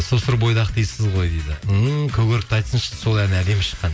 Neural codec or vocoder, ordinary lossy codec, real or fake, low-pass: none; none; real; none